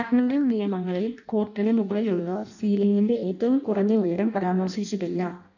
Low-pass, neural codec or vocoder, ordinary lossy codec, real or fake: 7.2 kHz; codec, 16 kHz in and 24 kHz out, 0.6 kbps, FireRedTTS-2 codec; none; fake